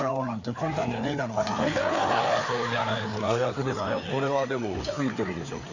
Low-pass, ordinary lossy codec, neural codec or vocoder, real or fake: 7.2 kHz; none; codec, 16 kHz, 4 kbps, FreqCodec, larger model; fake